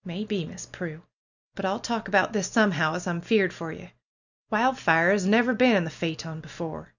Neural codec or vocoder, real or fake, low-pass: none; real; 7.2 kHz